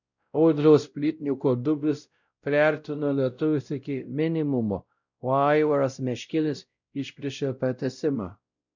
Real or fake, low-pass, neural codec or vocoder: fake; 7.2 kHz; codec, 16 kHz, 0.5 kbps, X-Codec, WavLM features, trained on Multilingual LibriSpeech